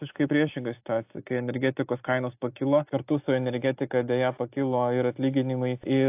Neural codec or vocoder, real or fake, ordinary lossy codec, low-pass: none; real; AAC, 32 kbps; 3.6 kHz